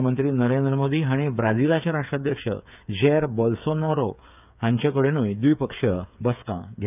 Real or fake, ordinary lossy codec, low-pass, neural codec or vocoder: fake; none; 3.6 kHz; codec, 16 kHz, 8 kbps, FreqCodec, smaller model